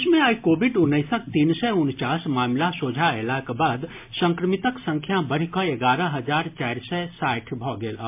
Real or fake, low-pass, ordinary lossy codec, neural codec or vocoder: real; 3.6 kHz; MP3, 32 kbps; none